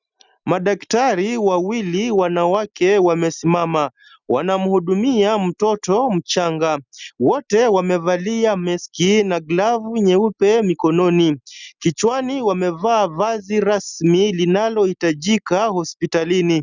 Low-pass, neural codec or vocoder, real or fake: 7.2 kHz; none; real